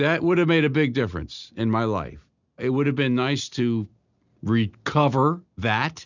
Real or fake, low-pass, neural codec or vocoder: real; 7.2 kHz; none